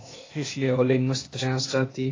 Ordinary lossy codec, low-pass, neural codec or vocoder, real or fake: AAC, 32 kbps; 7.2 kHz; codec, 16 kHz, 0.8 kbps, ZipCodec; fake